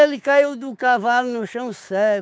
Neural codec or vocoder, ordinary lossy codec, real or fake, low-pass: codec, 16 kHz, 6 kbps, DAC; none; fake; none